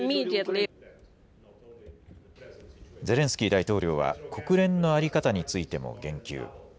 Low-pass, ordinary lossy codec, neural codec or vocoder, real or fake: none; none; none; real